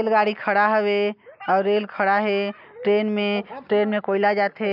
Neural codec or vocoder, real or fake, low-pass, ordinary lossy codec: none; real; 5.4 kHz; none